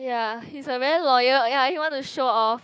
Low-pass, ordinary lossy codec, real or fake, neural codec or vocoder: none; none; fake; codec, 16 kHz, 6 kbps, DAC